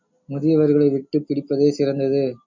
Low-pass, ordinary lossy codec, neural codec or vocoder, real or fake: 7.2 kHz; MP3, 64 kbps; none; real